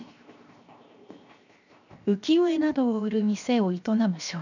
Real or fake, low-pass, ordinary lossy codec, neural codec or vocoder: fake; 7.2 kHz; none; codec, 16 kHz, 0.7 kbps, FocalCodec